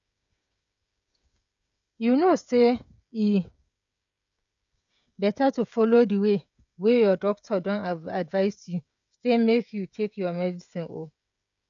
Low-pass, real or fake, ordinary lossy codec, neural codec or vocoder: 7.2 kHz; fake; none; codec, 16 kHz, 16 kbps, FreqCodec, smaller model